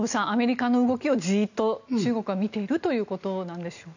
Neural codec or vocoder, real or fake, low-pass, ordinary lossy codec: none; real; 7.2 kHz; none